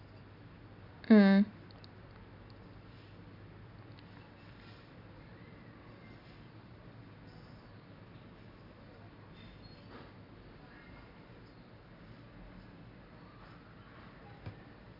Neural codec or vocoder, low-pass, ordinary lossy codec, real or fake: none; 5.4 kHz; none; real